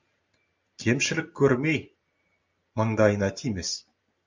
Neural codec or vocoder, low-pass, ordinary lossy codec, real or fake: vocoder, 44.1 kHz, 128 mel bands every 256 samples, BigVGAN v2; 7.2 kHz; MP3, 64 kbps; fake